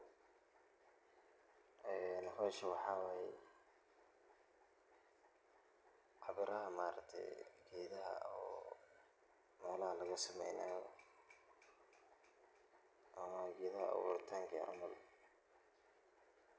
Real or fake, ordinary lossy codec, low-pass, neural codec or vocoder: real; none; none; none